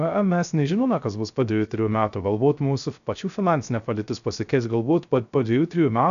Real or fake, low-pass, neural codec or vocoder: fake; 7.2 kHz; codec, 16 kHz, 0.3 kbps, FocalCodec